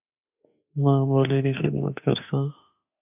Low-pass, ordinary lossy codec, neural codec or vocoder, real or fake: 3.6 kHz; AAC, 32 kbps; autoencoder, 48 kHz, 32 numbers a frame, DAC-VAE, trained on Japanese speech; fake